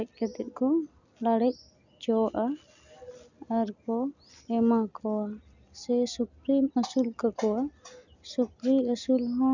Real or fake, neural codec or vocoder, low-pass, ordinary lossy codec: real; none; 7.2 kHz; none